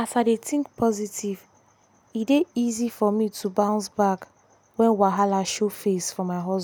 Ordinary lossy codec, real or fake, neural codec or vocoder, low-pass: none; real; none; none